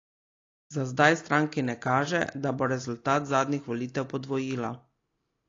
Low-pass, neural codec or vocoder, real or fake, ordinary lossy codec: 7.2 kHz; none; real; AAC, 32 kbps